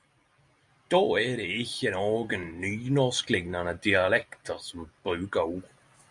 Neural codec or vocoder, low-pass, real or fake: none; 10.8 kHz; real